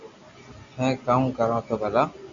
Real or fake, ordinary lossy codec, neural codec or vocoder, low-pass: real; MP3, 64 kbps; none; 7.2 kHz